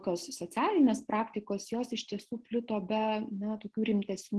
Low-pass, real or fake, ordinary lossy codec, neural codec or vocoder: 10.8 kHz; real; Opus, 16 kbps; none